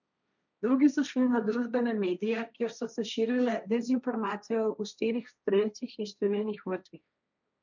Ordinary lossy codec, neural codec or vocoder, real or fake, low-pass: none; codec, 16 kHz, 1.1 kbps, Voila-Tokenizer; fake; 7.2 kHz